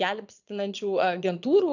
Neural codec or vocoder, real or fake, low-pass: vocoder, 22.05 kHz, 80 mel bands, Vocos; fake; 7.2 kHz